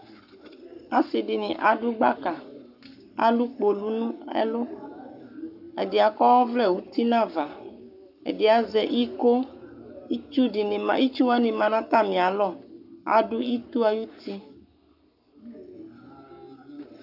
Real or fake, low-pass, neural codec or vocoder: fake; 5.4 kHz; codec, 44.1 kHz, 7.8 kbps, Pupu-Codec